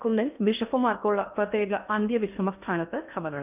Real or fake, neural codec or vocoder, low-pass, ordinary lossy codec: fake; codec, 16 kHz in and 24 kHz out, 0.6 kbps, FocalCodec, streaming, 4096 codes; 3.6 kHz; none